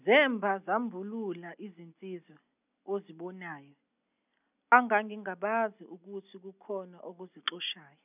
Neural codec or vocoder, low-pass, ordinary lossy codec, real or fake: none; 3.6 kHz; none; real